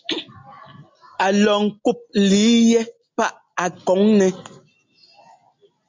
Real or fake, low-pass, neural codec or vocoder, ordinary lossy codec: real; 7.2 kHz; none; MP3, 64 kbps